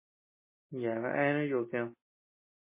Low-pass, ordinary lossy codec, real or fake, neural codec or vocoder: 3.6 kHz; MP3, 16 kbps; real; none